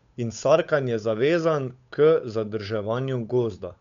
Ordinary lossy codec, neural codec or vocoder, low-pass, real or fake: none; codec, 16 kHz, 8 kbps, FunCodec, trained on Chinese and English, 25 frames a second; 7.2 kHz; fake